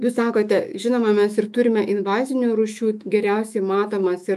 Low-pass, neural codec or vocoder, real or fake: 14.4 kHz; autoencoder, 48 kHz, 128 numbers a frame, DAC-VAE, trained on Japanese speech; fake